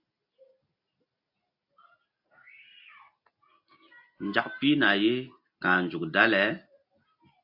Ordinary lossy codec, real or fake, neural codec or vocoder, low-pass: AAC, 48 kbps; real; none; 5.4 kHz